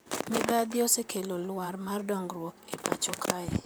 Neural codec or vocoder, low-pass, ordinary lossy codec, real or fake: vocoder, 44.1 kHz, 128 mel bands, Pupu-Vocoder; none; none; fake